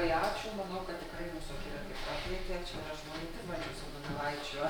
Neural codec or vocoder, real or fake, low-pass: none; real; 19.8 kHz